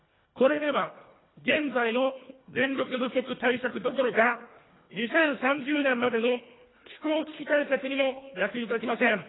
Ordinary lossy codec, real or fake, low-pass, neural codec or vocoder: AAC, 16 kbps; fake; 7.2 kHz; codec, 24 kHz, 1.5 kbps, HILCodec